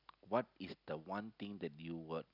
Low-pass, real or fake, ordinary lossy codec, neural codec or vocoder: 5.4 kHz; real; none; none